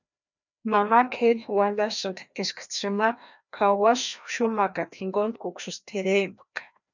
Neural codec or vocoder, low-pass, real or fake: codec, 16 kHz, 1 kbps, FreqCodec, larger model; 7.2 kHz; fake